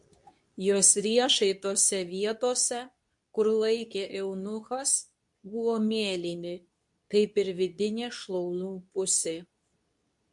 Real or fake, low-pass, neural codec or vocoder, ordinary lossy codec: fake; 10.8 kHz; codec, 24 kHz, 0.9 kbps, WavTokenizer, medium speech release version 2; MP3, 64 kbps